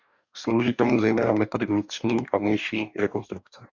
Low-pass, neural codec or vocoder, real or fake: 7.2 kHz; codec, 44.1 kHz, 2.6 kbps, DAC; fake